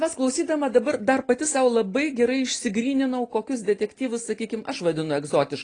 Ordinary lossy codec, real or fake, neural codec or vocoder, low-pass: AAC, 32 kbps; real; none; 9.9 kHz